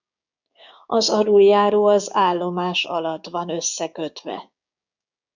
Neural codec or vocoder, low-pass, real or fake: codec, 16 kHz, 6 kbps, DAC; 7.2 kHz; fake